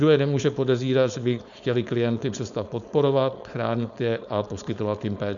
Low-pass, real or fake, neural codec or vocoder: 7.2 kHz; fake; codec, 16 kHz, 4.8 kbps, FACodec